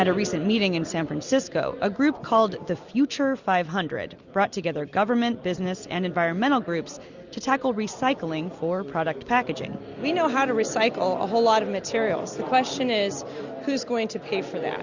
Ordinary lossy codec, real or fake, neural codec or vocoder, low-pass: Opus, 64 kbps; real; none; 7.2 kHz